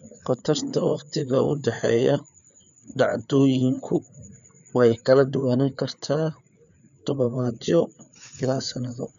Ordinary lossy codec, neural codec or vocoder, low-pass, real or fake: none; codec, 16 kHz, 4 kbps, FreqCodec, larger model; 7.2 kHz; fake